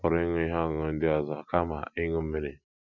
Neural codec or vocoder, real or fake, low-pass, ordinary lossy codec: none; real; 7.2 kHz; none